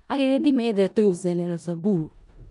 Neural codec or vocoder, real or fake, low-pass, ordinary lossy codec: codec, 16 kHz in and 24 kHz out, 0.4 kbps, LongCat-Audio-Codec, four codebook decoder; fake; 10.8 kHz; none